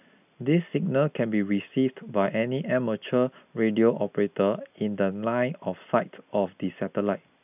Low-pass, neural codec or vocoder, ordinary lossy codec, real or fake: 3.6 kHz; none; none; real